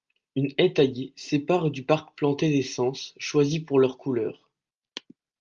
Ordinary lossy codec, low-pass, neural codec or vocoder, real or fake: Opus, 32 kbps; 7.2 kHz; none; real